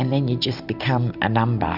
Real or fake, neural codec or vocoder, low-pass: real; none; 5.4 kHz